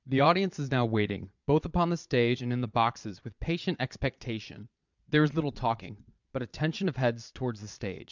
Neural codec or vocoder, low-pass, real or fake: vocoder, 22.05 kHz, 80 mel bands, Vocos; 7.2 kHz; fake